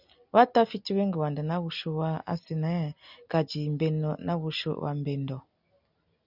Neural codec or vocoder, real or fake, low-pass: none; real; 5.4 kHz